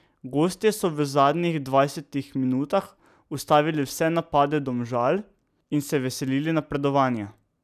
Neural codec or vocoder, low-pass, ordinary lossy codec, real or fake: none; 14.4 kHz; none; real